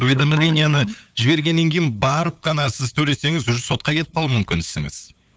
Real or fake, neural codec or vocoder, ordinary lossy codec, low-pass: fake; codec, 16 kHz, 8 kbps, FunCodec, trained on LibriTTS, 25 frames a second; none; none